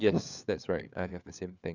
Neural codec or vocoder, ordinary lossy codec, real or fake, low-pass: codec, 16 kHz, 4 kbps, FunCodec, trained on LibriTTS, 50 frames a second; none; fake; 7.2 kHz